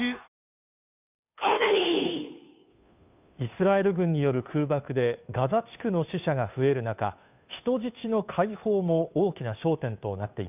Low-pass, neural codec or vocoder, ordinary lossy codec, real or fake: 3.6 kHz; codec, 16 kHz, 2 kbps, FunCodec, trained on Chinese and English, 25 frames a second; none; fake